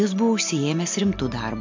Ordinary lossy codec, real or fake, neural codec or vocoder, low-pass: MP3, 48 kbps; real; none; 7.2 kHz